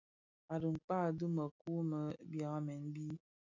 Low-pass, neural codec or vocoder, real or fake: 7.2 kHz; none; real